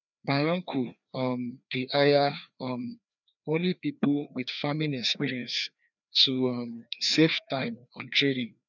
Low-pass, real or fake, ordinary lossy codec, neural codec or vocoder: 7.2 kHz; fake; none; codec, 16 kHz, 2 kbps, FreqCodec, larger model